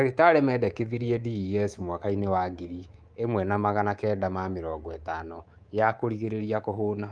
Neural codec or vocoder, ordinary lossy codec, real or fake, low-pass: codec, 24 kHz, 3.1 kbps, DualCodec; Opus, 32 kbps; fake; 9.9 kHz